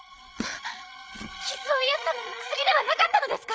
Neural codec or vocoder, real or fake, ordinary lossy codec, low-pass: codec, 16 kHz, 8 kbps, FreqCodec, larger model; fake; none; none